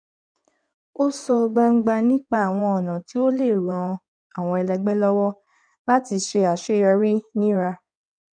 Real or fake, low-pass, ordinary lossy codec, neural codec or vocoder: fake; 9.9 kHz; none; codec, 16 kHz in and 24 kHz out, 2.2 kbps, FireRedTTS-2 codec